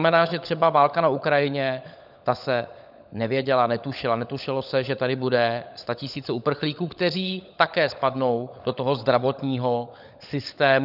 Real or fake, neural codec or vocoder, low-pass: fake; codec, 16 kHz, 16 kbps, FunCodec, trained on LibriTTS, 50 frames a second; 5.4 kHz